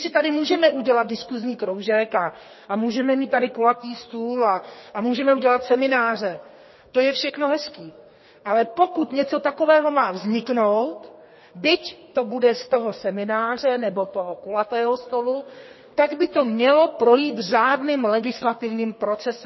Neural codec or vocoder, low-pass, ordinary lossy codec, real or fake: codec, 32 kHz, 1.9 kbps, SNAC; 7.2 kHz; MP3, 24 kbps; fake